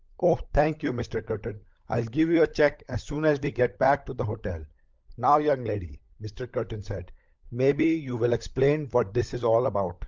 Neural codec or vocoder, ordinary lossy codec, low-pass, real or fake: codec, 16 kHz, 16 kbps, FunCodec, trained on LibriTTS, 50 frames a second; Opus, 32 kbps; 7.2 kHz; fake